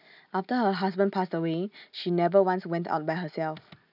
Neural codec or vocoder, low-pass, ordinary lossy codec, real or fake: none; 5.4 kHz; none; real